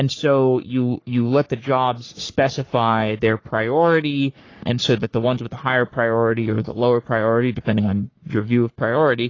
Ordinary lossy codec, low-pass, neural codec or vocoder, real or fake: AAC, 32 kbps; 7.2 kHz; codec, 44.1 kHz, 3.4 kbps, Pupu-Codec; fake